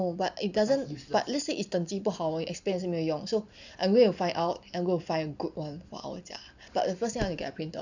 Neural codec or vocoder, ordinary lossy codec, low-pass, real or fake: none; none; 7.2 kHz; real